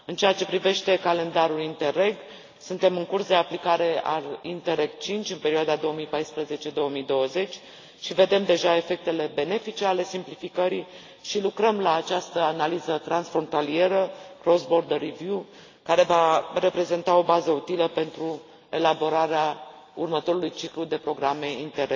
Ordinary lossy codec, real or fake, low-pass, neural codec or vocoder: AAC, 32 kbps; real; 7.2 kHz; none